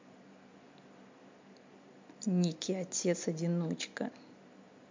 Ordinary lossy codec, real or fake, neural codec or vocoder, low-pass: MP3, 48 kbps; real; none; 7.2 kHz